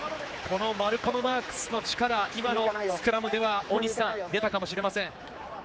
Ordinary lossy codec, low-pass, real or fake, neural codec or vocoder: none; none; fake; codec, 16 kHz, 4 kbps, X-Codec, HuBERT features, trained on general audio